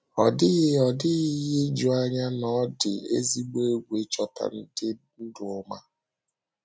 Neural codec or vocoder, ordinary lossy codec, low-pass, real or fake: none; none; none; real